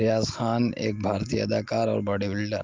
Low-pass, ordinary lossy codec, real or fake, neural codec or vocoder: 7.2 kHz; Opus, 32 kbps; real; none